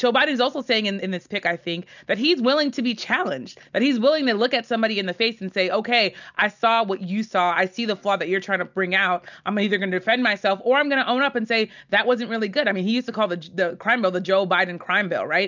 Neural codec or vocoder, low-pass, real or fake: none; 7.2 kHz; real